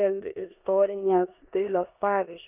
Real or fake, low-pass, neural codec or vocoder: fake; 3.6 kHz; codec, 16 kHz in and 24 kHz out, 0.9 kbps, LongCat-Audio-Codec, four codebook decoder